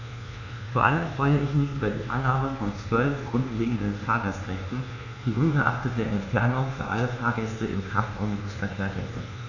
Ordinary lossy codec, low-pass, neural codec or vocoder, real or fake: none; 7.2 kHz; codec, 24 kHz, 1.2 kbps, DualCodec; fake